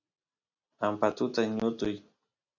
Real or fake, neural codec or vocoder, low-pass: real; none; 7.2 kHz